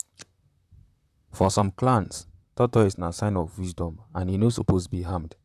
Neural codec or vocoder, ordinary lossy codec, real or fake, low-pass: vocoder, 44.1 kHz, 128 mel bands, Pupu-Vocoder; none; fake; 14.4 kHz